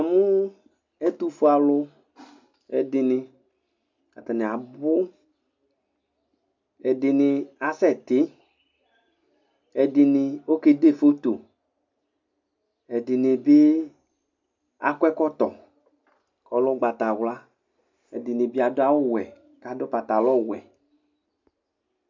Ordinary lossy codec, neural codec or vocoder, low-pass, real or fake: MP3, 64 kbps; none; 7.2 kHz; real